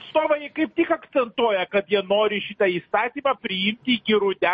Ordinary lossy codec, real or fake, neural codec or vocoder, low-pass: MP3, 32 kbps; real; none; 10.8 kHz